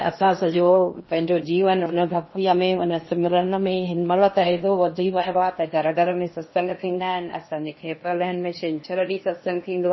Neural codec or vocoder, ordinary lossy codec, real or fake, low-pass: codec, 16 kHz in and 24 kHz out, 0.8 kbps, FocalCodec, streaming, 65536 codes; MP3, 24 kbps; fake; 7.2 kHz